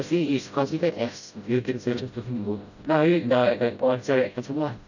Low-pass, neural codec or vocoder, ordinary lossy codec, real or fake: 7.2 kHz; codec, 16 kHz, 0.5 kbps, FreqCodec, smaller model; none; fake